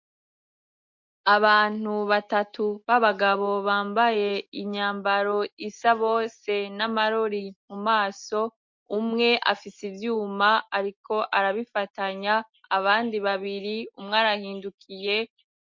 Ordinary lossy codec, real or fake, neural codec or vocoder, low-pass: MP3, 48 kbps; real; none; 7.2 kHz